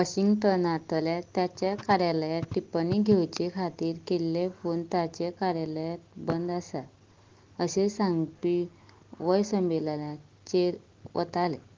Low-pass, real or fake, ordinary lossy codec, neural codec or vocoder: 7.2 kHz; real; Opus, 16 kbps; none